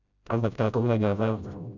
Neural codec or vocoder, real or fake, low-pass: codec, 16 kHz, 0.5 kbps, FreqCodec, smaller model; fake; 7.2 kHz